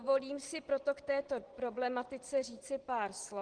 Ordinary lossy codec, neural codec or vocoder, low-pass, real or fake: Opus, 16 kbps; none; 9.9 kHz; real